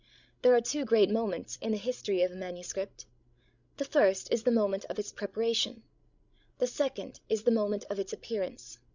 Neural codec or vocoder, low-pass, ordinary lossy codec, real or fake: codec, 16 kHz, 16 kbps, FreqCodec, larger model; 7.2 kHz; Opus, 64 kbps; fake